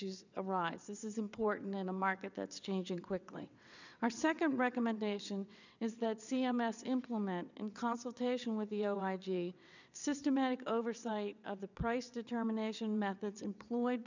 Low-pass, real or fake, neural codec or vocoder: 7.2 kHz; fake; vocoder, 22.05 kHz, 80 mel bands, WaveNeXt